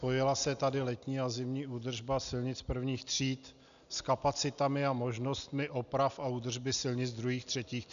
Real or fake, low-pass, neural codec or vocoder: real; 7.2 kHz; none